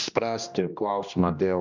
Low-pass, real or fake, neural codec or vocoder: 7.2 kHz; fake; codec, 16 kHz, 1 kbps, X-Codec, HuBERT features, trained on general audio